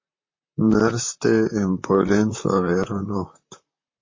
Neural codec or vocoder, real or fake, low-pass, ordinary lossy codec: vocoder, 44.1 kHz, 128 mel bands, Pupu-Vocoder; fake; 7.2 kHz; MP3, 32 kbps